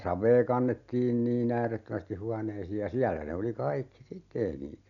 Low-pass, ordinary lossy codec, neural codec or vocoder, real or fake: 7.2 kHz; none; none; real